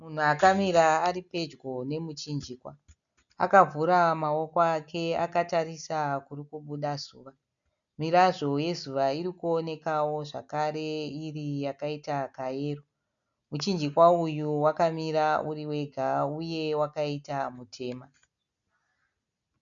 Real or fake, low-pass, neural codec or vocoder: real; 7.2 kHz; none